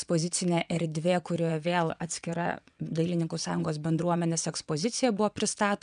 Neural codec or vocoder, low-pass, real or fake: vocoder, 22.05 kHz, 80 mel bands, WaveNeXt; 9.9 kHz; fake